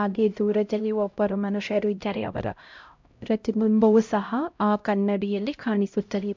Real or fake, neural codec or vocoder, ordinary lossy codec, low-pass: fake; codec, 16 kHz, 0.5 kbps, X-Codec, HuBERT features, trained on LibriSpeech; MP3, 64 kbps; 7.2 kHz